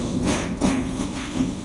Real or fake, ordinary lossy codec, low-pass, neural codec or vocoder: fake; AAC, 32 kbps; 10.8 kHz; codec, 24 kHz, 0.5 kbps, DualCodec